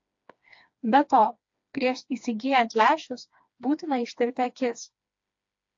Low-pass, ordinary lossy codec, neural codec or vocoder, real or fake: 7.2 kHz; AAC, 48 kbps; codec, 16 kHz, 2 kbps, FreqCodec, smaller model; fake